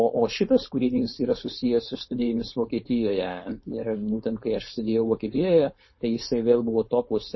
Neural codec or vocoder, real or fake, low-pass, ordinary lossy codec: codec, 16 kHz, 4.8 kbps, FACodec; fake; 7.2 kHz; MP3, 24 kbps